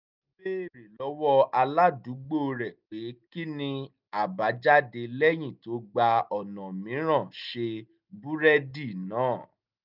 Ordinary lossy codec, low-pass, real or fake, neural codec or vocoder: none; 5.4 kHz; real; none